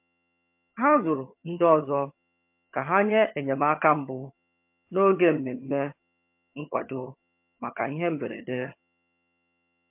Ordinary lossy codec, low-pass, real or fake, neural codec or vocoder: MP3, 32 kbps; 3.6 kHz; fake; vocoder, 22.05 kHz, 80 mel bands, HiFi-GAN